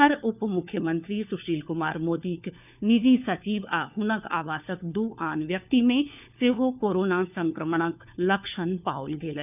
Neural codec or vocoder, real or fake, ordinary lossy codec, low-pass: codec, 16 kHz, 4 kbps, FunCodec, trained on LibriTTS, 50 frames a second; fake; none; 3.6 kHz